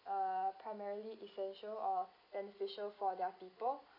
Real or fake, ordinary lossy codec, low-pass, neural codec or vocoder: real; none; 5.4 kHz; none